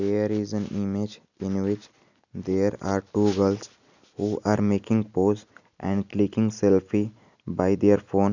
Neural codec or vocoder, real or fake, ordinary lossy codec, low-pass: none; real; none; 7.2 kHz